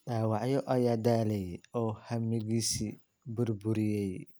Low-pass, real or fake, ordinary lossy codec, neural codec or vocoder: none; real; none; none